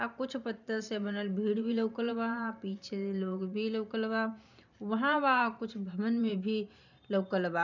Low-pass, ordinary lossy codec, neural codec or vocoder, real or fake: 7.2 kHz; none; vocoder, 44.1 kHz, 128 mel bands every 512 samples, BigVGAN v2; fake